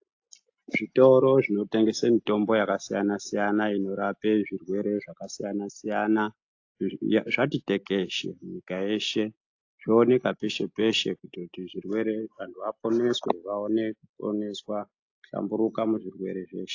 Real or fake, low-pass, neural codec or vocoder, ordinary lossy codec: real; 7.2 kHz; none; AAC, 48 kbps